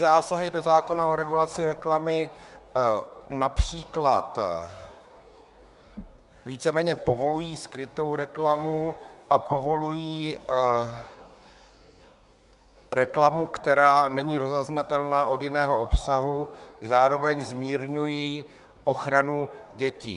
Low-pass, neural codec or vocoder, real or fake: 10.8 kHz; codec, 24 kHz, 1 kbps, SNAC; fake